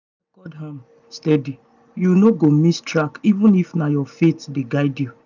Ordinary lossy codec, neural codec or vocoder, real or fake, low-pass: none; none; real; 7.2 kHz